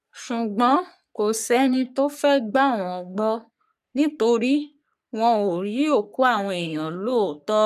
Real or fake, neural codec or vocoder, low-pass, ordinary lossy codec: fake; codec, 44.1 kHz, 3.4 kbps, Pupu-Codec; 14.4 kHz; none